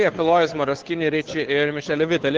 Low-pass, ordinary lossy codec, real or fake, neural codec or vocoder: 7.2 kHz; Opus, 16 kbps; fake; codec, 16 kHz, 2 kbps, FunCodec, trained on Chinese and English, 25 frames a second